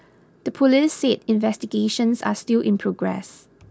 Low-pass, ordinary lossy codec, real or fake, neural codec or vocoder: none; none; real; none